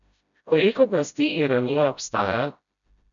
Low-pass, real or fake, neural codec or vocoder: 7.2 kHz; fake; codec, 16 kHz, 0.5 kbps, FreqCodec, smaller model